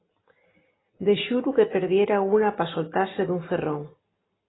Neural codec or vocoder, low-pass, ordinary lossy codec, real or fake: none; 7.2 kHz; AAC, 16 kbps; real